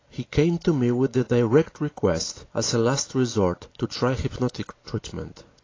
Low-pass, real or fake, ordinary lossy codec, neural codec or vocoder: 7.2 kHz; real; AAC, 32 kbps; none